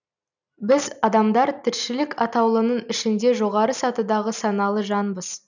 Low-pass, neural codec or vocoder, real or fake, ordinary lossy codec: 7.2 kHz; none; real; none